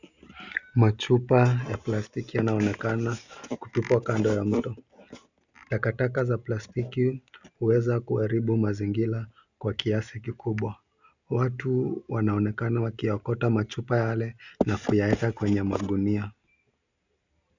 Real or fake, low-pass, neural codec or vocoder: real; 7.2 kHz; none